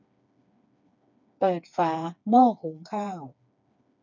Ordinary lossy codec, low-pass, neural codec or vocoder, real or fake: none; 7.2 kHz; codec, 16 kHz, 4 kbps, FreqCodec, smaller model; fake